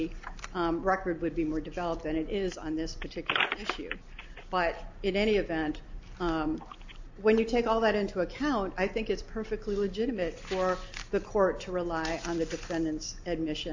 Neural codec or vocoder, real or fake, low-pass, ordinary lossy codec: none; real; 7.2 kHz; AAC, 48 kbps